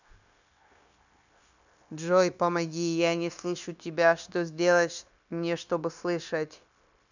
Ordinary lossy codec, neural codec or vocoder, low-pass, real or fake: none; codec, 16 kHz, 0.9 kbps, LongCat-Audio-Codec; 7.2 kHz; fake